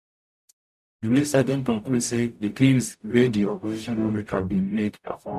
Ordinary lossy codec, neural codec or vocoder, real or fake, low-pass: none; codec, 44.1 kHz, 0.9 kbps, DAC; fake; 14.4 kHz